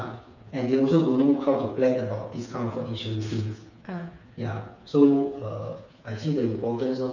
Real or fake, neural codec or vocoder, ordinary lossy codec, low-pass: fake; codec, 16 kHz, 4 kbps, FreqCodec, smaller model; none; 7.2 kHz